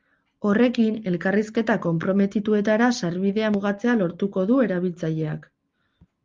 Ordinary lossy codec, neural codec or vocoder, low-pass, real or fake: Opus, 32 kbps; none; 7.2 kHz; real